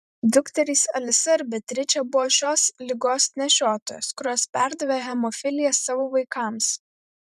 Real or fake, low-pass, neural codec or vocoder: fake; 14.4 kHz; vocoder, 44.1 kHz, 128 mel bands every 512 samples, BigVGAN v2